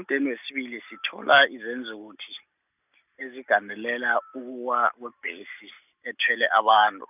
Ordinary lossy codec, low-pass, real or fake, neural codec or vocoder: none; 3.6 kHz; real; none